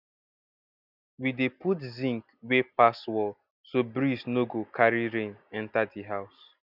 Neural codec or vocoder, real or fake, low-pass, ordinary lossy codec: none; real; 5.4 kHz; none